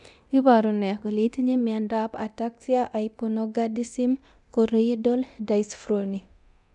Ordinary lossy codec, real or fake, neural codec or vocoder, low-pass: none; fake; codec, 24 kHz, 0.9 kbps, DualCodec; 10.8 kHz